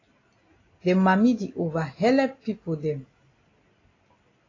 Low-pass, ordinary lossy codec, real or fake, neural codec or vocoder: 7.2 kHz; AAC, 32 kbps; real; none